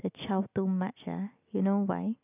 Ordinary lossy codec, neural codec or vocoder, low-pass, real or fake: none; none; 3.6 kHz; real